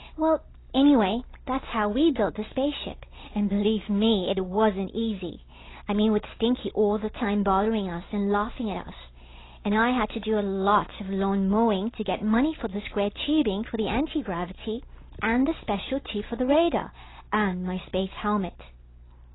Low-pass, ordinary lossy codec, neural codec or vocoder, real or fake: 7.2 kHz; AAC, 16 kbps; none; real